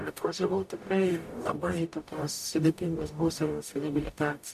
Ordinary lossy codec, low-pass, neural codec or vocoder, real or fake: AAC, 96 kbps; 14.4 kHz; codec, 44.1 kHz, 0.9 kbps, DAC; fake